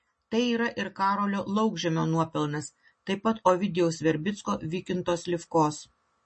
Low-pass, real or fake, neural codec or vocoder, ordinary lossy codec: 9.9 kHz; real; none; MP3, 32 kbps